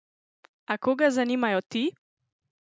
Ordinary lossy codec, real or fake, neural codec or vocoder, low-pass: none; real; none; none